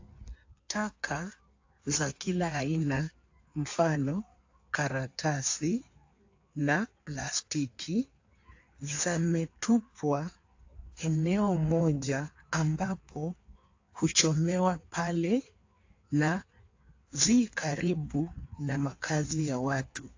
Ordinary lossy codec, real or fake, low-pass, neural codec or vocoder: AAC, 48 kbps; fake; 7.2 kHz; codec, 16 kHz in and 24 kHz out, 1.1 kbps, FireRedTTS-2 codec